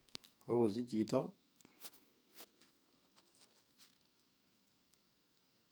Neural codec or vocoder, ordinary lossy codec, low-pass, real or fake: codec, 44.1 kHz, 2.6 kbps, SNAC; none; none; fake